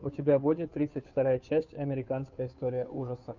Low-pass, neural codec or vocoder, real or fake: 7.2 kHz; codec, 16 kHz, 2 kbps, FunCodec, trained on Chinese and English, 25 frames a second; fake